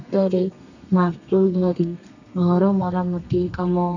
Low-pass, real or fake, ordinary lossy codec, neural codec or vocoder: 7.2 kHz; fake; none; codec, 32 kHz, 1.9 kbps, SNAC